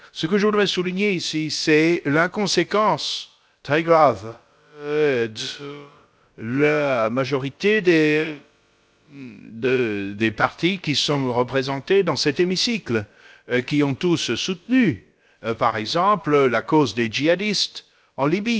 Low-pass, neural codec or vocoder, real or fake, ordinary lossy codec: none; codec, 16 kHz, about 1 kbps, DyCAST, with the encoder's durations; fake; none